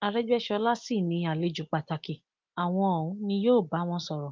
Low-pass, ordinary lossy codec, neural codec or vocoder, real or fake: 7.2 kHz; Opus, 24 kbps; none; real